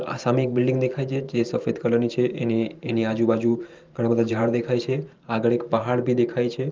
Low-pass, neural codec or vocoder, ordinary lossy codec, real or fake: 7.2 kHz; vocoder, 44.1 kHz, 128 mel bands every 512 samples, BigVGAN v2; Opus, 32 kbps; fake